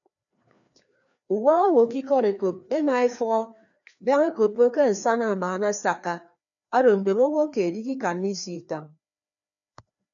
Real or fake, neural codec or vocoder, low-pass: fake; codec, 16 kHz, 2 kbps, FreqCodec, larger model; 7.2 kHz